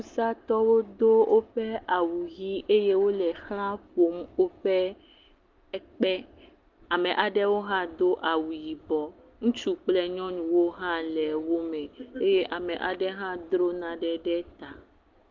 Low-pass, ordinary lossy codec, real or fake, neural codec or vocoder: 7.2 kHz; Opus, 24 kbps; real; none